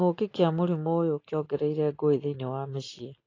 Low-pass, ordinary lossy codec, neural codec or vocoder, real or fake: 7.2 kHz; AAC, 32 kbps; none; real